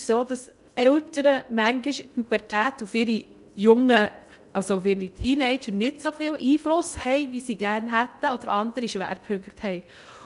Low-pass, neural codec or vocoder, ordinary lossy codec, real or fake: 10.8 kHz; codec, 16 kHz in and 24 kHz out, 0.6 kbps, FocalCodec, streaming, 2048 codes; none; fake